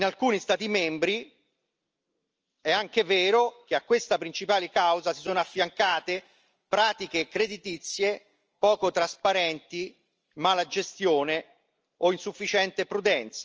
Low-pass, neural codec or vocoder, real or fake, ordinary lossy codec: 7.2 kHz; none; real; Opus, 24 kbps